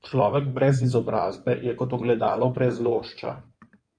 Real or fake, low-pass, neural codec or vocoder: fake; 9.9 kHz; codec, 16 kHz in and 24 kHz out, 2.2 kbps, FireRedTTS-2 codec